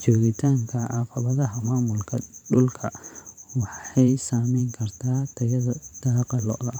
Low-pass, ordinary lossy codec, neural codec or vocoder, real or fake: 19.8 kHz; none; vocoder, 44.1 kHz, 128 mel bands every 512 samples, BigVGAN v2; fake